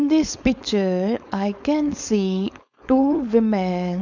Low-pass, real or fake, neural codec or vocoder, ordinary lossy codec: 7.2 kHz; fake; codec, 16 kHz, 4.8 kbps, FACodec; none